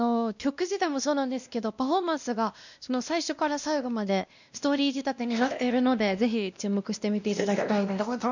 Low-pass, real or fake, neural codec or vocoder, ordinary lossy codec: 7.2 kHz; fake; codec, 16 kHz, 1 kbps, X-Codec, WavLM features, trained on Multilingual LibriSpeech; none